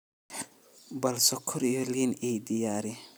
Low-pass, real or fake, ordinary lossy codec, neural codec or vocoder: none; real; none; none